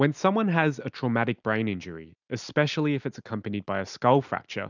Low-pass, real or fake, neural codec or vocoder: 7.2 kHz; real; none